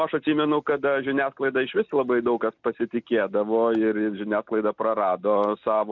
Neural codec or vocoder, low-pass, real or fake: none; 7.2 kHz; real